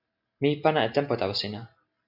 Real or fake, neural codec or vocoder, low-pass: real; none; 5.4 kHz